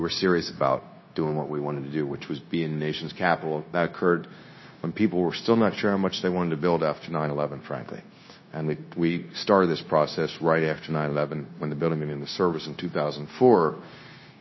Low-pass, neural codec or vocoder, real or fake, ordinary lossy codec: 7.2 kHz; codec, 16 kHz, 0.9 kbps, LongCat-Audio-Codec; fake; MP3, 24 kbps